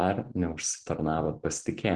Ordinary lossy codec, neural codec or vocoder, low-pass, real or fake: Opus, 16 kbps; none; 9.9 kHz; real